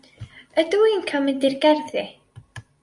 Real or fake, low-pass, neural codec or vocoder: real; 10.8 kHz; none